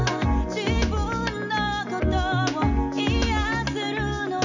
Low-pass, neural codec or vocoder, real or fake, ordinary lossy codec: 7.2 kHz; none; real; none